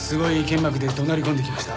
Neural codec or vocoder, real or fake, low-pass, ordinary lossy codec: none; real; none; none